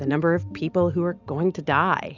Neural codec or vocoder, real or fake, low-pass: none; real; 7.2 kHz